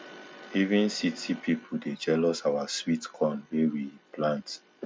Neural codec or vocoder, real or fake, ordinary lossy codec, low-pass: none; real; none; none